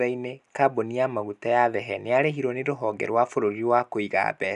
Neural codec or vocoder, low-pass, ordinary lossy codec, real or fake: none; 10.8 kHz; none; real